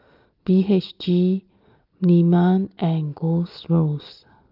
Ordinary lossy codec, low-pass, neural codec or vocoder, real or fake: Opus, 32 kbps; 5.4 kHz; none; real